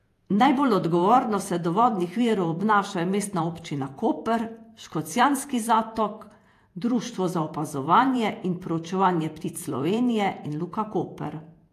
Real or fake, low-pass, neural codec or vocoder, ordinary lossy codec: fake; 14.4 kHz; vocoder, 48 kHz, 128 mel bands, Vocos; AAC, 64 kbps